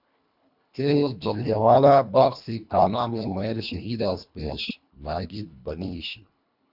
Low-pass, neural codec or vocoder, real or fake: 5.4 kHz; codec, 24 kHz, 1.5 kbps, HILCodec; fake